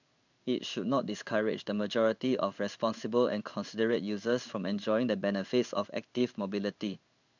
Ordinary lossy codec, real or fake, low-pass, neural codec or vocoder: none; fake; 7.2 kHz; codec, 16 kHz in and 24 kHz out, 1 kbps, XY-Tokenizer